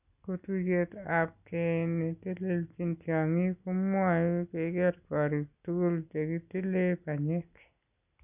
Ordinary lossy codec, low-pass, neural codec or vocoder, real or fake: none; 3.6 kHz; none; real